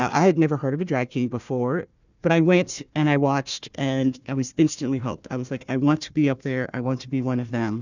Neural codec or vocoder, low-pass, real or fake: codec, 16 kHz, 1 kbps, FunCodec, trained on Chinese and English, 50 frames a second; 7.2 kHz; fake